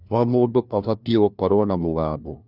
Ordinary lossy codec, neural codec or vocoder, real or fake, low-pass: none; codec, 16 kHz, 1 kbps, FunCodec, trained on LibriTTS, 50 frames a second; fake; 5.4 kHz